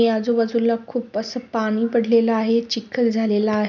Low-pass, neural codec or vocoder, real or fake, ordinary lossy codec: 7.2 kHz; none; real; none